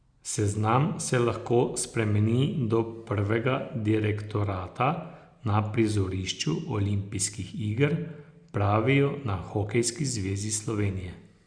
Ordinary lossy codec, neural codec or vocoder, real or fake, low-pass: Opus, 64 kbps; none; real; 9.9 kHz